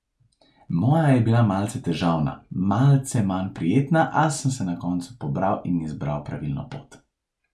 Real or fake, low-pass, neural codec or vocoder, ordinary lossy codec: real; none; none; none